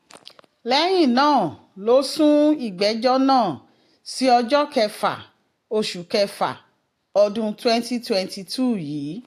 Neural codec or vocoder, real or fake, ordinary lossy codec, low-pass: none; real; AAC, 64 kbps; 14.4 kHz